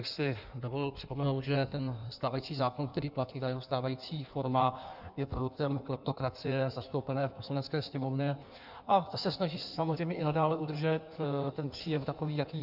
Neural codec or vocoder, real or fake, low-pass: codec, 16 kHz in and 24 kHz out, 1.1 kbps, FireRedTTS-2 codec; fake; 5.4 kHz